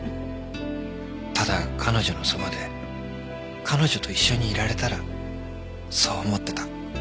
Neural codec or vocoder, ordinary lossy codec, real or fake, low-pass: none; none; real; none